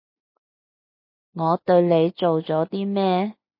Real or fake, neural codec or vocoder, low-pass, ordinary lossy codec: real; none; 5.4 kHz; MP3, 32 kbps